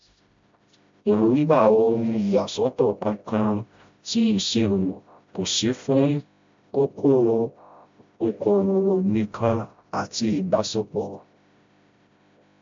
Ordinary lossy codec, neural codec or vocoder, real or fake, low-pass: MP3, 48 kbps; codec, 16 kHz, 0.5 kbps, FreqCodec, smaller model; fake; 7.2 kHz